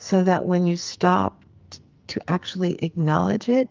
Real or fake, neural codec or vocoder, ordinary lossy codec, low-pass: fake; codec, 44.1 kHz, 2.6 kbps, SNAC; Opus, 24 kbps; 7.2 kHz